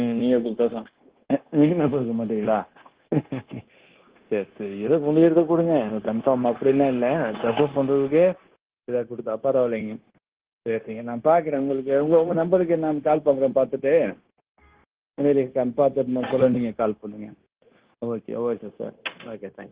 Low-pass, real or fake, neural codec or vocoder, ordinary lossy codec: 3.6 kHz; fake; codec, 16 kHz, 0.9 kbps, LongCat-Audio-Codec; Opus, 16 kbps